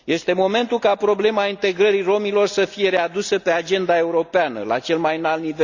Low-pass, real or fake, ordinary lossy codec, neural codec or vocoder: 7.2 kHz; real; none; none